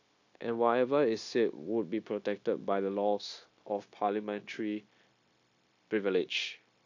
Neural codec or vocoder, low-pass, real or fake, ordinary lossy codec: codec, 16 kHz, 0.9 kbps, LongCat-Audio-Codec; 7.2 kHz; fake; AAC, 48 kbps